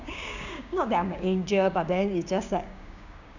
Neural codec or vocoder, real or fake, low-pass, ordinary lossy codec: codec, 16 kHz, 6 kbps, DAC; fake; 7.2 kHz; none